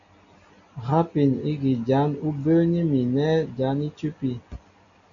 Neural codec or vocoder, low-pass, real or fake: none; 7.2 kHz; real